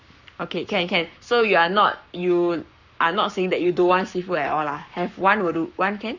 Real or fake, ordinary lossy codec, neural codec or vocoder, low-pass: fake; none; codec, 44.1 kHz, 7.8 kbps, Pupu-Codec; 7.2 kHz